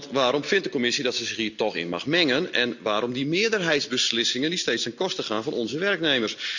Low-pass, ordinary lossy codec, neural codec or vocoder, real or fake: 7.2 kHz; none; none; real